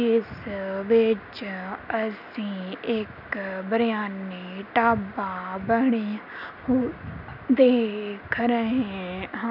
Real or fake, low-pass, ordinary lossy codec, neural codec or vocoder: real; 5.4 kHz; none; none